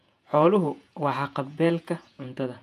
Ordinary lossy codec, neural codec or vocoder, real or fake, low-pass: none; vocoder, 48 kHz, 128 mel bands, Vocos; fake; 14.4 kHz